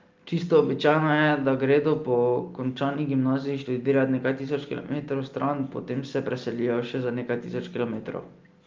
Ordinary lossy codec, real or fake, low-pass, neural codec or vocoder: Opus, 32 kbps; real; 7.2 kHz; none